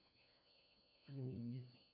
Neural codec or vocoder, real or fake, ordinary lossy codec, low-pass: codec, 16 kHz, 1 kbps, FunCodec, trained on LibriTTS, 50 frames a second; fake; none; 5.4 kHz